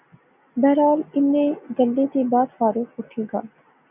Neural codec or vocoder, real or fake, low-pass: none; real; 3.6 kHz